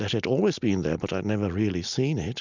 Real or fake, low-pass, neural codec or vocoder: real; 7.2 kHz; none